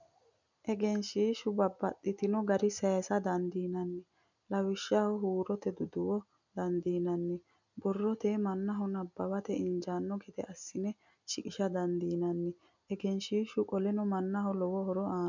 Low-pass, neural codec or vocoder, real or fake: 7.2 kHz; none; real